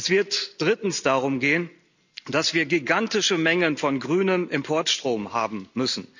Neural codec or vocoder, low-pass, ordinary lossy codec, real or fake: none; 7.2 kHz; none; real